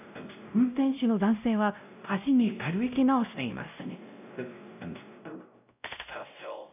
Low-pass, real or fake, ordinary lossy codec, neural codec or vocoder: 3.6 kHz; fake; none; codec, 16 kHz, 0.5 kbps, X-Codec, WavLM features, trained on Multilingual LibriSpeech